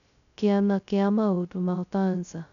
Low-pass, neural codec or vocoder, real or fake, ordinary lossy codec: 7.2 kHz; codec, 16 kHz, 0.2 kbps, FocalCodec; fake; none